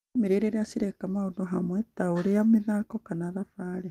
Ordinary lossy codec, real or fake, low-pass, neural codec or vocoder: Opus, 32 kbps; real; 14.4 kHz; none